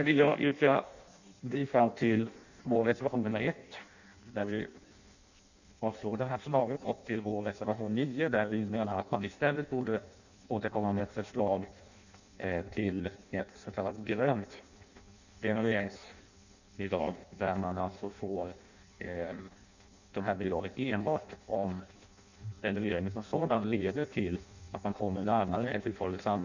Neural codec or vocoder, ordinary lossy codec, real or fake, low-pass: codec, 16 kHz in and 24 kHz out, 0.6 kbps, FireRedTTS-2 codec; none; fake; 7.2 kHz